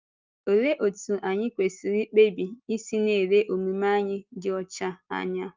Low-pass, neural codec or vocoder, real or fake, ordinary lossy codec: 7.2 kHz; none; real; Opus, 32 kbps